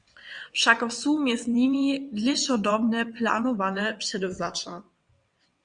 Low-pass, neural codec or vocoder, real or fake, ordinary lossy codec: 9.9 kHz; vocoder, 22.05 kHz, 80 mel bands, WaveNeXt; fake; Opus, 64 kbps